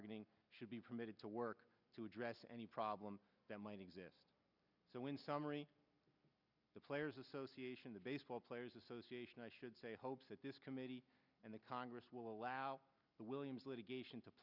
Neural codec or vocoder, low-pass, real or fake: none; 5.4 kHz; real